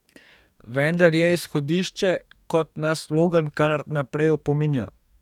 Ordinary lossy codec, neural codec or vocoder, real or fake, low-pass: none; codec, 44.1 kHz, 2.6 kbps, DAC; fake; 19.8 kHz